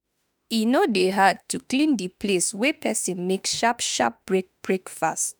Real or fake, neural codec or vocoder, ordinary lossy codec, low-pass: fake; autoencoder, 48 kHz, 32 numbers a frame, DAC-VAE, trained on Japanese speech; none; none